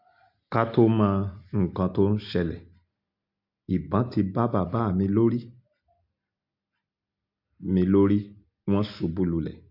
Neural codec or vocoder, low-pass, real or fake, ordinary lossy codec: none; 5.4 kHz; real; MP3, 48 kbps